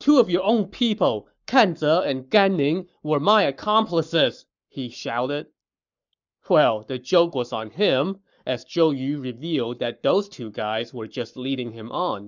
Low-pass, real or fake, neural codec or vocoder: 7.2 kHz; fake; codec, 44.1 kHz, 7.8 kbps, Pupu-Codec